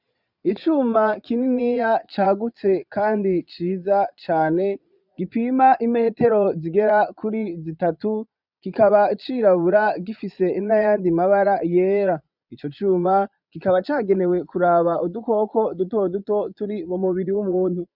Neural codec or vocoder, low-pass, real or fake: vocoder, 22.05 kHz, 80 mel bands, Vocos; 5.4 kHz; fake